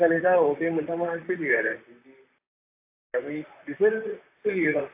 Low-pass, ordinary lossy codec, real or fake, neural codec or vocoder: 3.6 kHz; AAC, 32 kbps; fake; vocoder, 44.1 kHz, 128 mel bands, Pupu-Vocoder